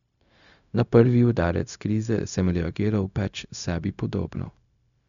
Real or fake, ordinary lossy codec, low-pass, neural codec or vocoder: fake; none; 7.2 kHz; codec, 16 kHz, 0.4 kbps, LongCat-Audio-Codec